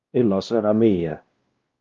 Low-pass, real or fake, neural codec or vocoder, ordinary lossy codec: 7.2 kHz; fake; codec, 16 kHz, 1 kbps, X-Codec, WavLM features, trained on Multilingual LibriSpeech; Opus, 32 kbps